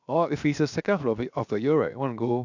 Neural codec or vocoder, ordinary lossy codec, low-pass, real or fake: codec, 16 kHz, 0.7 kbps, FocalCodec; none; 7.2 kHz; fake